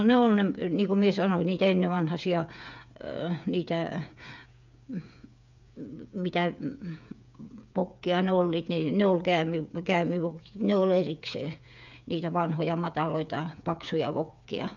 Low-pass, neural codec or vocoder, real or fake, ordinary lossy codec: 7.2 kHz; codec, 16 kHz, 8 kbps, FreqCodec, smaller model; fake; none